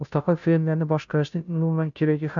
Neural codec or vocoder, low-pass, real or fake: codec, 16 kHz, 0.5 kbps, FunCodec, trained on Chinese and English, 25 frames a second; 7.2 kHz; fake